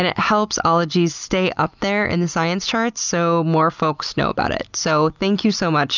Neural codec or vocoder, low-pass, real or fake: none; 7.2 kHz; real